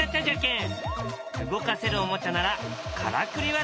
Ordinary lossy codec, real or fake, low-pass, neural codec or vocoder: none; real; none; none